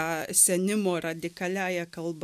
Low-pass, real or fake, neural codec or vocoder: 14.4 kHz; real; none